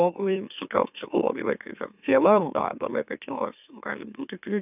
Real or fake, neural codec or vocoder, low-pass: fake; autoencoder, 44.1 kHz, a latent of 192 numbers a frame, MeloTTS; 3.6 kHz